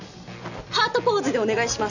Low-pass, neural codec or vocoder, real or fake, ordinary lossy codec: 7.2 kHz; none; real; none